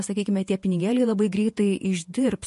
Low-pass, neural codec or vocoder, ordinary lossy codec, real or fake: 14.4 kHz; vocoder, 48 kHz, 128 mel bands, Vocos; MP3, 48 kbps; fake